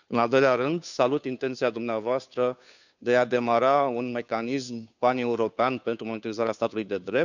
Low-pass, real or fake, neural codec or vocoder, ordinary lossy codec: 7.2 kHz; fake; codec, 16 kHz, 2 kbps, FunCodec, trained on Chinese and English, 25 frames a second; none